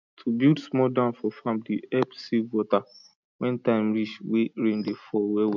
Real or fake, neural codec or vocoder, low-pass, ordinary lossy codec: real; none; 7.2 kHz; none